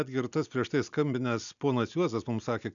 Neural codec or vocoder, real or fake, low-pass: none; real; 7.2 kHz